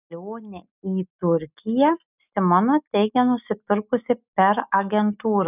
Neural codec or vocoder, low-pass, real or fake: none; 3.6 kHz; real